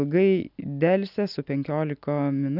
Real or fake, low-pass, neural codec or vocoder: real; 5.4 kHz; none